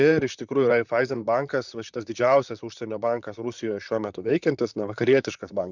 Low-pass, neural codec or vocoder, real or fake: 7.2 kHz; vocoder, 44.1 kHz, 128 mel bands, Pupu-Vocoder; fake